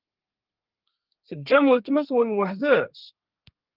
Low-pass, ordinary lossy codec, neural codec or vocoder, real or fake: 5.4 kHz; Opus, 32 kbps; codec, 32 kHz, 1.9 kbps, SNAC; fake